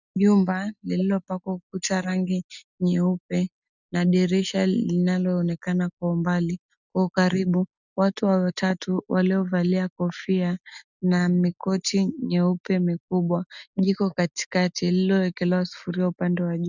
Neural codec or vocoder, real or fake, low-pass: none; real; 7.2 kHz